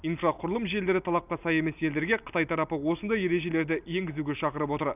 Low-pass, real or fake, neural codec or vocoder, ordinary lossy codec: 3.6 kHz; real; none; none